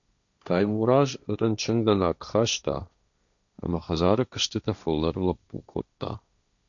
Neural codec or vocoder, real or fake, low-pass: codec, 16 kHz, 1.1 kbps, Voila-Tokenizer; fake; 7.2 kHz